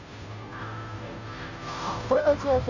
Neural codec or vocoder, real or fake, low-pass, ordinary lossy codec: codec, 16 kHz, 0.5 kbps, FunCodec, trained on Chinese and English, 25 frames a second; fake; 7.2 kHz; none